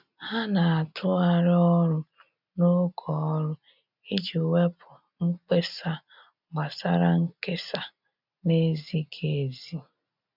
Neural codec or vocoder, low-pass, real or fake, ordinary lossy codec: none; 5.4 kHz; real; none